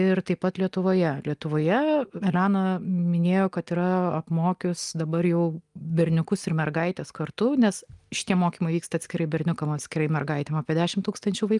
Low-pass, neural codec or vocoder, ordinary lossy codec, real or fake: 10.8 kHz; none; Opus, 32 kbps; real